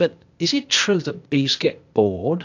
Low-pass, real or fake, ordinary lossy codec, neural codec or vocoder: 7.2 kHz; fake; AAC, 48 kbps; codec, 16 kHz, 0.8 kbps, ZipCodec